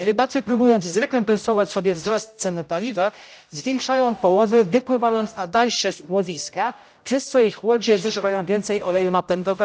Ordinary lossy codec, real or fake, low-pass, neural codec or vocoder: none; fake; none; codec, 16 kHz, 0.5 kbps, X-Codec, HuBERT features, trained on general audio